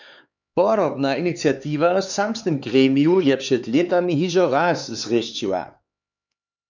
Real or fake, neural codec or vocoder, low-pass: fake; codec, 16 kHz, 4 kbps, X-Codec, HuBERT features, trained on LibriSpeech; 7.2 kHz